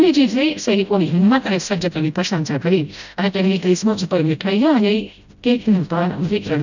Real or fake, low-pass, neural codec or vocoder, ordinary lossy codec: fake; 7.2 kHz; codec, 16 kHz, 0.5 kbps, FreqCodec, smaller model; none